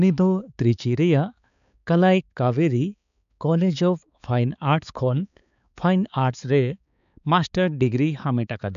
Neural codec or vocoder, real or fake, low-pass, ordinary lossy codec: codec, 16 kHz, 4 kbps, X-Codec, HuBERT features, trained on balanced general audio; fake; 7.2 kHz; none